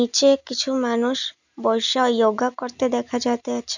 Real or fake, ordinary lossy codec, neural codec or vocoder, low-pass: real; none; none; 7.2 kHz